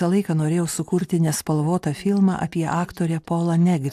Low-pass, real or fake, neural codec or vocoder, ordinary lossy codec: 14.4 kHz; real; none; AAC, 96 kbps